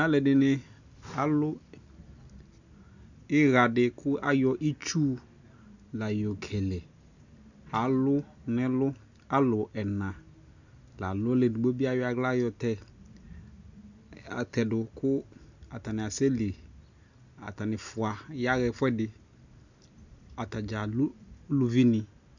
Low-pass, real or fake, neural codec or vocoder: 7.2 kHz; real; none